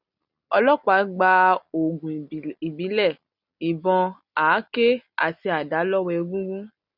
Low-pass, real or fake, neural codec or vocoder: 5.4 kHz; real; none